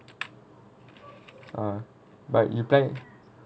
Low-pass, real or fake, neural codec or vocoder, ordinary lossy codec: none; real; none; none